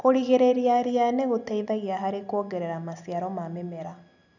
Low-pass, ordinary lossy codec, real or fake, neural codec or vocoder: 7.2 kHz; none; real; none